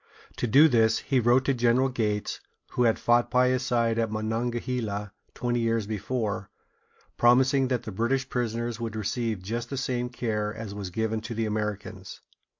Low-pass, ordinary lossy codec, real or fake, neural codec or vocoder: 7.2 kHz; MP3, 48 kbps; real; none